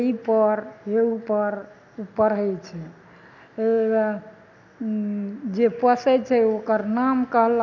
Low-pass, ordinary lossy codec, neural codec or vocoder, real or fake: 7.2 kHz; none; none; real